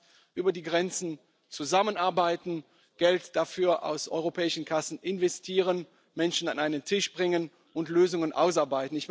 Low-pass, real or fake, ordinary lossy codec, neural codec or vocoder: none; real; none; none